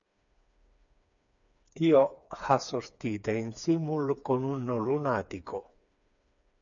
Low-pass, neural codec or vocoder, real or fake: 7.2 kHz; codec, 16 kHz, 4 kbps, FreqCodec, smaller model; fake